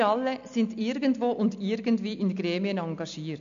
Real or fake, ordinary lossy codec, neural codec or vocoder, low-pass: real; AAC, 96 kbps; none; 7.2 kHz